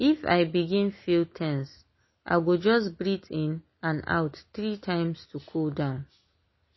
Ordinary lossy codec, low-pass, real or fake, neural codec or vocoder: MP3, 24 kbps; 7.2 kHz; real; none